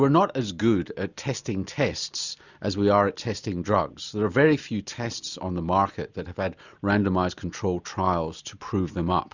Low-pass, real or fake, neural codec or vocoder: 7.2 kHz; real; none